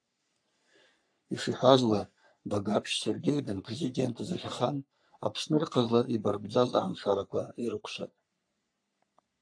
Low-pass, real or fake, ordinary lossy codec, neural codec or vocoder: 9.9 kHz; fake; AAC, 64 kbps; codec, 44.1 kHz, 3.4 kbps, Pupu-Codec